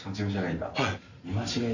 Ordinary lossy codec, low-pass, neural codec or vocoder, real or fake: none; 7.2 kHz; none; real